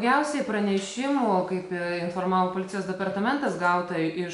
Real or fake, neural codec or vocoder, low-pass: real; none; 10.8 kHz